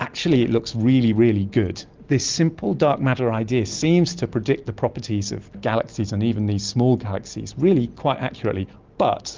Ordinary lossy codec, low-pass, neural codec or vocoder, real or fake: Opus, 24 kbps; 7.2 kHz; none; real